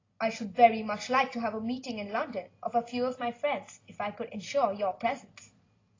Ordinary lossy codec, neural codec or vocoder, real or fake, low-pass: AAC, 32 kbps; none; real; 7.2 kHz